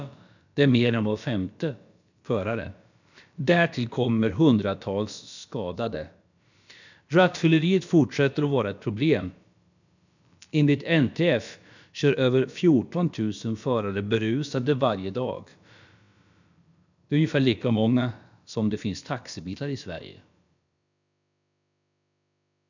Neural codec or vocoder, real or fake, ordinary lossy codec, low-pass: codec, 16 kHz, about 1 kbps, DyCAST, with the encoder's durations; fake; none; 7.2 kHz